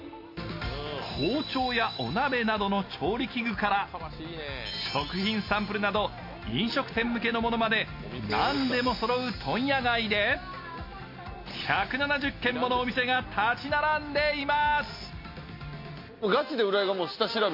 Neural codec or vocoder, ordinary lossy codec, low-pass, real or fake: none; MP3, 32 kbps; 5.4 kHz; real